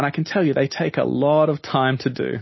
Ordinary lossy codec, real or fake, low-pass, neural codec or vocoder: MP3, 24 kbps; real; 7.2 kHz; none